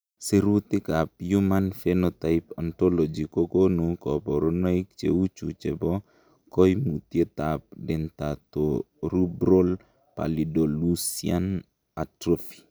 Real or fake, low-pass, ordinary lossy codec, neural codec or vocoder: real; none; none; none